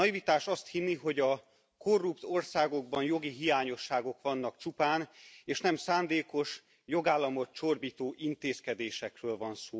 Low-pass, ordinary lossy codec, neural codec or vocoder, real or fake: none; none; none; real